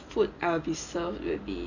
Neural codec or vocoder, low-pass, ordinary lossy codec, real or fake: none; 7.2 kHz; none; real